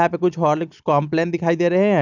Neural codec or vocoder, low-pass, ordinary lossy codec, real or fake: none; 7.2 kHz; none; real